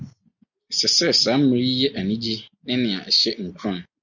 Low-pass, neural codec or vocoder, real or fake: 7.2 kHz; none; real